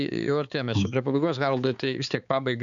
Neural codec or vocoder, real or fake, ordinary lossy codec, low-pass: codec, 16 kHz, 4 kbps, X-Codec, HuBERT features, trained on balanced general audio; fake; MP3, 96 kbps; 7.2 kHz